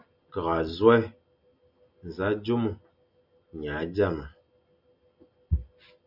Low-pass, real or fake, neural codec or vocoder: 5.4 kHz; real; none